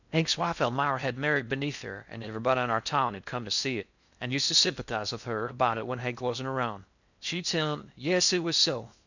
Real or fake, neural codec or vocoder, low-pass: fake; codec, 16 kHz in and 24 kHz out, 0.6 kbps, FocalCodec, streaming, 4096 codes; 7.2 kHz